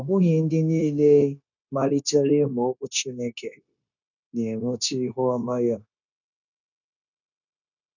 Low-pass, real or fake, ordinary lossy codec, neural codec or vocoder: 7.2 kHz; fake; none; codec, 16 kHz, 0.9 kbps, LongCat-Audio-Codec